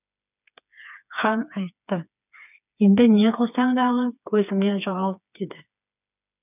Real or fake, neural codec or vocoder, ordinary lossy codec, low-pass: fake; codec, 16 kHz, 4 kbps, FreqCodec, smaller model; none; 3.6 kHz